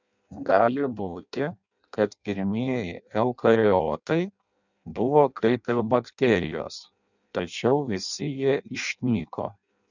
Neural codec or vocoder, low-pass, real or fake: codec, 16 kHz in and 24 kHz out, 0.6 kbps, FireRedTTS-2 codec; 7.2 kHz; fake